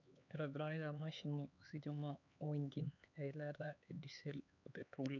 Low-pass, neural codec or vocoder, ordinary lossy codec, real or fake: 7.2 kHz; codec, 16 kHz, 4 kbps, X-Codec, HuBERT features, trained on LibriSpeech; none; fake